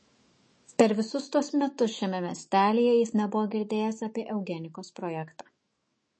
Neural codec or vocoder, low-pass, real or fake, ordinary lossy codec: autoencoder, 48 kHz, 128 numbers a frame, DAC-VAE, trained on Japanese speech; 9.9 kHz; fake; MP3, 32 kbps